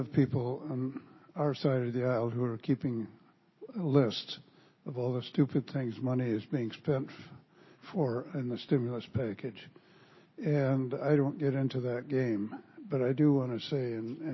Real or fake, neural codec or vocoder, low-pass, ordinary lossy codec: real; none; 7.2 kHz; MP3, 24 kbps